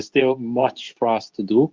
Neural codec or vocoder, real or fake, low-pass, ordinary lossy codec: codec, 24 kHz, 0.9 kbps, WavTokenizer, medium speech release version 2; fake; 7.2 kHz; Opus, 24 kbps